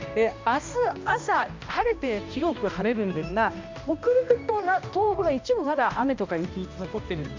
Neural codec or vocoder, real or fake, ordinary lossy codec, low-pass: codec, 16 kHz, 1 kbps, X-Codec, HuBERT features, trained on balanced general audio; fake; MP3, 64 kbps; 7.2 kHz